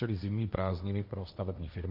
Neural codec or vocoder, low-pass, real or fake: codec, 16 kHz, 1.1 kbps, Voila-Tokenizer; 5.4 kHz; fake